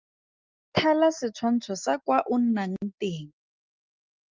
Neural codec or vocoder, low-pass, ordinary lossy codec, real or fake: none; 7.2 kHz; Opus, 32 kbps; real